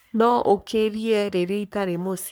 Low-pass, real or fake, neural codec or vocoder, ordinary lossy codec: none; fake; codec, 44.1 kHz, 3.4 kbps, Pupu-Codec; none